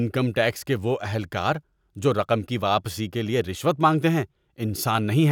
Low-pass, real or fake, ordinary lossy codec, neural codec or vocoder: 19.8 kHz; real; none; none